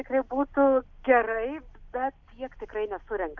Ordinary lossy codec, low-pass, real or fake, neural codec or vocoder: AAC, 48 kbps; 7.2 kHz; real; none